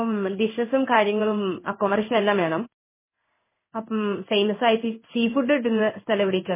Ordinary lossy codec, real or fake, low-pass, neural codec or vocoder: MP3, 16 kbps; fake; 3.6 kHz; codec, 16 kHz in and 24 kHz out, 1 kbps, XY-Tokenizer